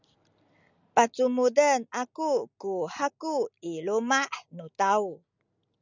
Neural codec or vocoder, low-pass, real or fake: none; 7.2 kHz; real